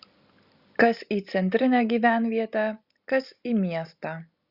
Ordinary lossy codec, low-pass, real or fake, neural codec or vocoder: Opus, 64 kbps; 5.4 kHz; real; none